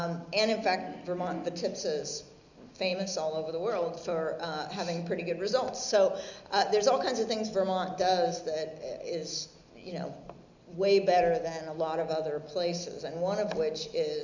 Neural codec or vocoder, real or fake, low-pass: none; real; 7.2 kHz